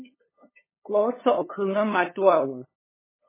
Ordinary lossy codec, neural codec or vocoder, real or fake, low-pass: MP3, 16 kbps; codec, 16 kHz, 2 kbps, FunCodec, trained on LibriTTS, 25 frames a second; fake; 3.6 kHz